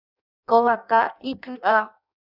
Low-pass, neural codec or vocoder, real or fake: 5.4 kHz; codec, 16 kHz in and 24 kHz out, 0.6 kbps, FireRedTTS-2 codec; fake